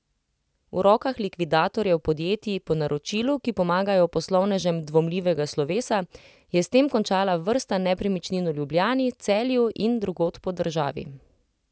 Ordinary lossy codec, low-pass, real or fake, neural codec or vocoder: none; none; real; none